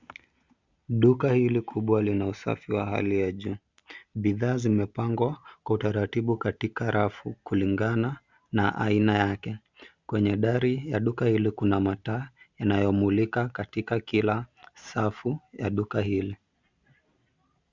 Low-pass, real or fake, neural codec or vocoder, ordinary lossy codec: 7.2 kHz; real; none; Opus, 64 kbps